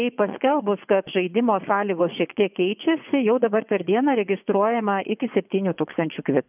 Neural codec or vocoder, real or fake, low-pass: vocoder, 24 kHz, 100 mel bands, Vocos; fake; 3.6 kHz